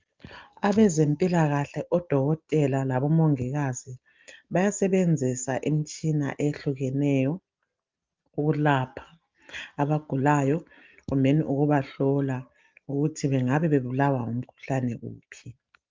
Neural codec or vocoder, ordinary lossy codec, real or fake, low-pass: none; Opus, 24 kbps; real; 7.2 kHz